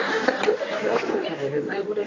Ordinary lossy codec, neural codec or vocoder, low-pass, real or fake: AAC, 32 kbps; codec, 24 kHz, 0.9 kbps, WavTokenizer, medium speech release version 1; 7.2 kHz; fake